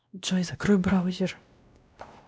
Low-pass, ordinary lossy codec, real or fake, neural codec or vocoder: none; none; fake; codec, 16 kHz, 1 kbps, X-Codec, WavLM features, trained on Multilingual LibriSpeech